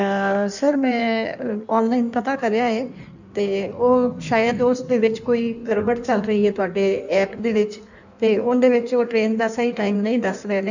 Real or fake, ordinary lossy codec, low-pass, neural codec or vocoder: fake; none; 7.2 kHz; codec, 16 kHz in and 24 kHz out, 1.1 kbps, FireRedTTS-2 codec